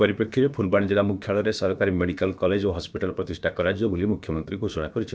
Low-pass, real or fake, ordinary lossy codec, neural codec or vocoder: none; fake; none; codec, 16 kHz, about 1 kbps, DyCAST, with the encoder's durations